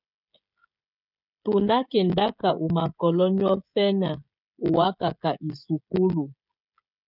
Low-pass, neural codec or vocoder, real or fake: 5.4 kHz; codec, 16 kHz, 16 kbps, FreqCodec, smaller model; fake